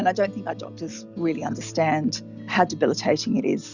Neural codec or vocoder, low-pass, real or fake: none; 7.2 kHz; real